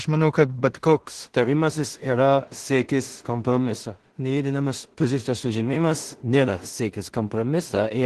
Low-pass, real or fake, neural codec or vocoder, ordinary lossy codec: 10.8 kHz; fake; codec, 16 kHz in and 24 kHz out, 0.4 kbps, LongCat-Audio-Codec, two codebook decoder; Opus, 16 kbps